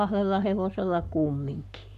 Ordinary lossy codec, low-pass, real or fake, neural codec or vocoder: none; 14.4 kHz; fake; codec, 44.1 kHz, 7.8 kbps, Pupu-Codec